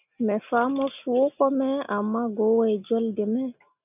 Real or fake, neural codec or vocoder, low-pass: real; none; 3.6 kHz